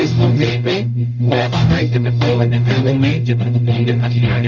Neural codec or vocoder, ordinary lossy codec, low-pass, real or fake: codec, 44.1 kHz, 0.9 kbps, DAC; none; 7.2 kHz; fake